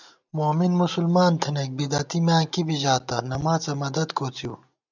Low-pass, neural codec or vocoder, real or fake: 7.2 kHz; none; real